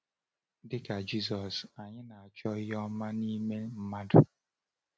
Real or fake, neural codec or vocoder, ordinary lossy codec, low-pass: real; none; none; none